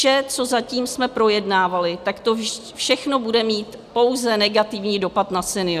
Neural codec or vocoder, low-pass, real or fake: none; 14.4 kHz; real